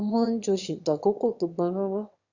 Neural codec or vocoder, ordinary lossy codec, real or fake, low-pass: autoencoder, 22.05 kHz, a latent of 192 numbers a frame, VITS, trained on one speaker; Opus, 64 kbps; fake; 7.2 kHz